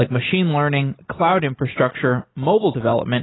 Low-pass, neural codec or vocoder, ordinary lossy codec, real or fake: 7.2 kHz; none; AAC, 16 kbps; real